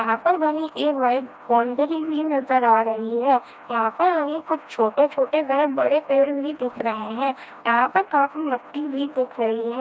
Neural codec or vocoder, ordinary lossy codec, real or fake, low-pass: codec, 16 kHz, 1 kbps, FreqCodec, smaller model; none; fake; none